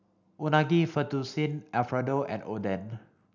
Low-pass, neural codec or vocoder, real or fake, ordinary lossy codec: 7.2 kHz; none; real; none